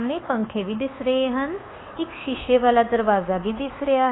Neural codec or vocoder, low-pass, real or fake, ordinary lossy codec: codec, 24 kHz, 1.2 kbps, DualCodec; 7.2 kHz; fake; AAC, 16 kbps